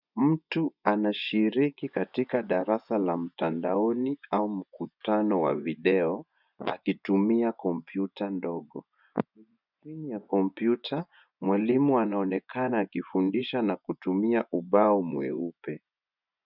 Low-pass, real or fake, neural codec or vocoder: 5.4 kHz; fake; vocoder, 24 kHz, 100 mel bands, Vocos